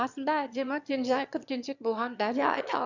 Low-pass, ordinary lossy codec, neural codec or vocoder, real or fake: 7.2 kHz; none; autoencoder, 22.05 kHz, a latent of 192 numbers a frame, VITS, trained on one speaker; fake